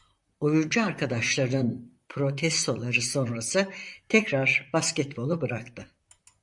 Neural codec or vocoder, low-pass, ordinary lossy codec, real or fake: vocoder, 44.1 kHz, 128 mel bands, Pupu-Vocoder; 10.8 kHz; MP3, 96 kbps; fake